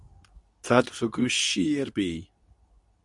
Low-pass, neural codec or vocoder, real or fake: 10.8 kHz; codec, 24 kHz, 0.9 kbps, WavTokenizer, medium speech release version 2; fake